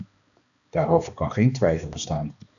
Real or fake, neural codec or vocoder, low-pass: fake; codec, 16 kHz, 2 kbps, X-Codec, HuBERT features, trained on balanced general audio; 7.2 kHz